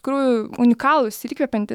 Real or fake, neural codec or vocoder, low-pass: fake; autoencoder, 48 kHz, 128 numbers a frame, DAC-VAE, trained on Japanese speech; 19.8 kHz